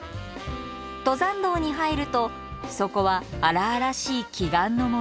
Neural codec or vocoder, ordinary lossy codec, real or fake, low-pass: none; none; real; none